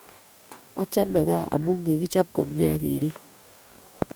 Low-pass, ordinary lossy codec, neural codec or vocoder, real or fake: none; none; codec, 44.1 kHz, 2.6 kbps, DAC; fake